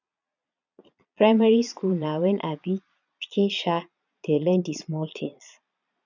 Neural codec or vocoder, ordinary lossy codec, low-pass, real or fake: vocoder, 22.05 kHz, 80 mel bands, Vocos; none; 7.2 kHz; fake